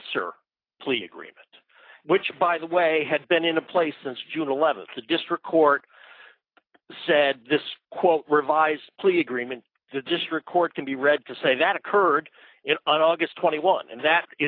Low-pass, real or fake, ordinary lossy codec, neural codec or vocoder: 5.4 kHz; real; AAC, 32 kbps; none